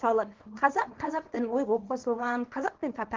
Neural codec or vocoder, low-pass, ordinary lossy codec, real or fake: codec, 24 kHz, 0.9 kbps, WavTokenizer, small release; 7.2 kHz; Opus, 16 kbps; fake